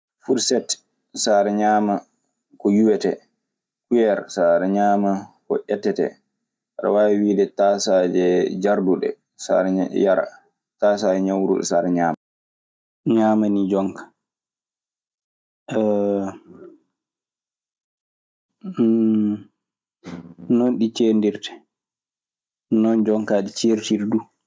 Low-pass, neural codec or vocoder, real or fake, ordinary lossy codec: none; none; real; none